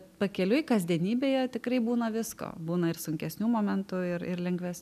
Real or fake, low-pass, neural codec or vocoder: real; 14.4 kHz; none